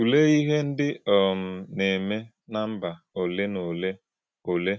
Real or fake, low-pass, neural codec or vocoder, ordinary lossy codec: real; none; none; none